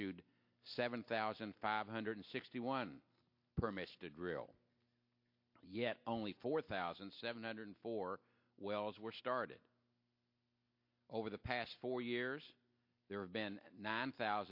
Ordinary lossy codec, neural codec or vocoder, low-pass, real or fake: MP3, 32 kbps; none; 5.4 kHz; real